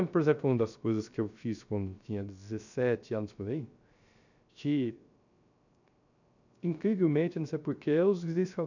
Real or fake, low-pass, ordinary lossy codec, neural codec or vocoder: fake; 7.2 kHz; none; codec, 16 kHz, 0.3 kbps, FocalCodec